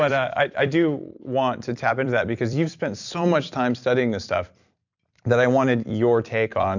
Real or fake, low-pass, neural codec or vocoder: fake; 7.2 kHz; vocoder, 44.1 kHz, 128 mel bands every 256 samples, BigVGAN v2